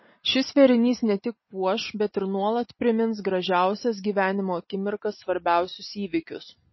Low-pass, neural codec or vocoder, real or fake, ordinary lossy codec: 7.2 kHz; none; real; MP3, 24 kbps